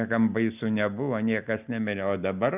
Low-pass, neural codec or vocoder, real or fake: 3.6 kHz; none; real